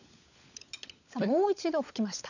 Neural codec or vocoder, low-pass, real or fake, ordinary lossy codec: codec, 16 kHz, 16 kbps, FunCodec, trained on LibriTTS, 50 frames a second; 7.2 kHz; fake; none